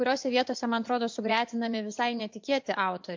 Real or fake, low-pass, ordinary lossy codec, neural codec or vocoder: fake; 7.2 kHz; MP3, 48 kbps; vocoder, 24 kHz, 100 mel bands, Vocos